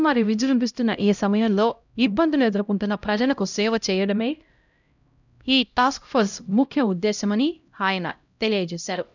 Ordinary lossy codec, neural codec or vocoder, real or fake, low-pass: none; codec, 16 kHz, 0.5 kbps, X-Codec, HuBERT features, trained on LibriSpeech; fake; 7.2 kHz